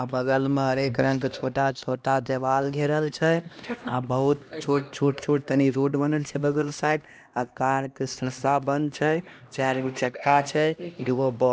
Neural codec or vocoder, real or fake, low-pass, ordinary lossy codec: codec, 16 kHz, 1 kbps, X-Codec, HuBERT features, trained on LibriSpeech; fake; none; none